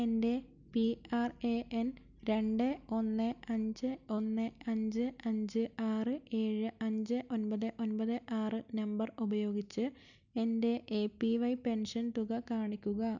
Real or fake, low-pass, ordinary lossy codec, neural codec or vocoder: real; 7.2 kHz; none; none